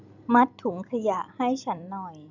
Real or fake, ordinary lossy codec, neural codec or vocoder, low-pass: fake; none; vocoder, 44.1 kHz, 128 mel bands every 256 samples, BigVGAN v2; 7.2 kHz